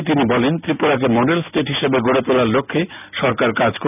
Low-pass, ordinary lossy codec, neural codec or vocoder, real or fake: 3.6 kHz; none; none; real